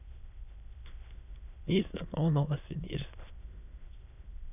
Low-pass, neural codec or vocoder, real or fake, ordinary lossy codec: 3.6 kHz; autoencoder, 22.05 kHz, a latent of 192 numbers a frame, VITS, trained on many speakers; fake; none